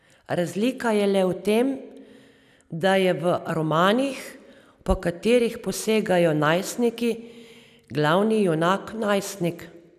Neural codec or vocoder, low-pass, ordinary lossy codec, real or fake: none; 14.4 kHz; none; real